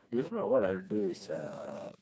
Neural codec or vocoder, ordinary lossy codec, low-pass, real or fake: codec, 16 kHz, 2 kbps, FreqCodec, smaller model; none; none; fake